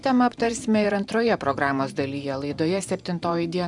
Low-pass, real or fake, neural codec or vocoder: 10.8 kHz; fake; vocoder, 44.1 kHz, 128 mel bands every 512 samples, BigVGAN v2